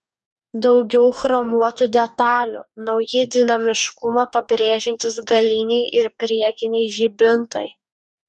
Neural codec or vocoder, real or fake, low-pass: codec, 44.1 kHz, 2.6 kbps, DAC; fake; 10.8 kHz